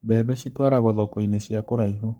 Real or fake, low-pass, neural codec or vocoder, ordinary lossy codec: fake; none; codec, 44.1 kHz, 3.4 kbps, Pupu-Codec; none